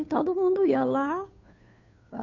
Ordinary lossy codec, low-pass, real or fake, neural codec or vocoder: none; 7.2 kHz; fake; codec, 16 kHz, 2 kbps, FunCodec, trained on Chinese and English, 25 frames a second